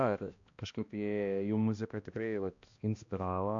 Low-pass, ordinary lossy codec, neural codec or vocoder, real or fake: 7.2 kHz; AAC, 64 kbps; codec, 16 kHz, 1 kbps, X-Codec, HuBERT features, trained on balanced general audio; fake